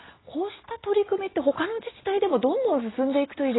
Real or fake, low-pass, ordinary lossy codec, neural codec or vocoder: real; 7.2 kHz; AAC, 16 kbps; none